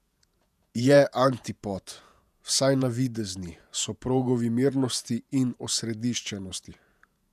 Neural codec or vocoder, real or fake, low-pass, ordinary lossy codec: vocoder, 48 kHz, 128 mel bands, Vocos; fake; 14.4 kHz; none